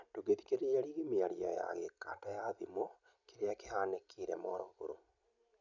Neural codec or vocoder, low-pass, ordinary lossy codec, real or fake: none; 7.2 kHz; none; real